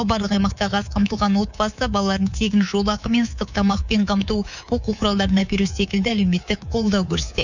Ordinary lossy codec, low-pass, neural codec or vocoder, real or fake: none; 7.2 kHz; codec, 24 kHz, 3.1 kbps, DualCodec; fake